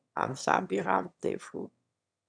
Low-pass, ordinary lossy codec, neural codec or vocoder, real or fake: 9.9 kHz; MP3, 96 kbps; autoencoder, 22.05 kHz, a latent of 192 numbers a frame, VITS, trained on one speaker; fake